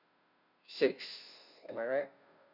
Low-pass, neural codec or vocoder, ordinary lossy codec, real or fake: 5.4 kHz; codec, 16 kHz, 0.5 kbps, FunCodec, trained on Chinese and English, 25 frames a second; none; fake